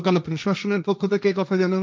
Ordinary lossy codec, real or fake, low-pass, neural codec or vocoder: none; fake; none; codec, 16 kHz, 1.1 kbps, Voila-Tokenizer